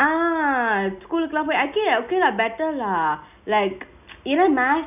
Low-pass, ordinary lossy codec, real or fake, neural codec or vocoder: 3.6 kHz; none; real; none